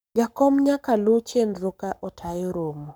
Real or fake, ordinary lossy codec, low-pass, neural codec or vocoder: fake; none; none; codec, 44.1 kHz, 7.8 kbps, Pupu-Codec